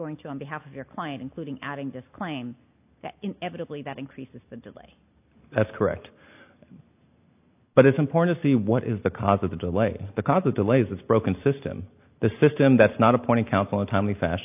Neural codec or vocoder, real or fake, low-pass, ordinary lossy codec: none; real; 3.6 kHz; AAC, 32 kbps